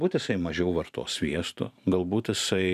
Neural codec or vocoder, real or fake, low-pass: vocoder, 44.1 kHz, 128 mel bands every 512 samples, BigVGAN v2; fake; 14.4 kHz